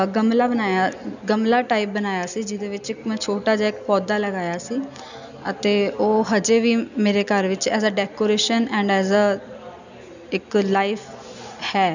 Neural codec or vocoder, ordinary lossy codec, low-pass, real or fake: none; none; 7.2 kHz; real